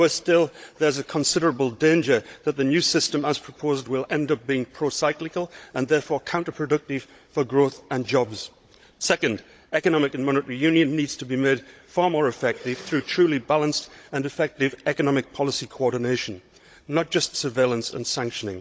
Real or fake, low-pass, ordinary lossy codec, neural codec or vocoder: fake; none; none; codec, 16 kHz, 16 kbps, FunCodec, trained on Chinese and English, 50 frames a second